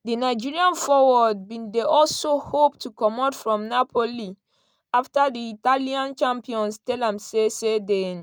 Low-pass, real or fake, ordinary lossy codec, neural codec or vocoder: none; real; none; none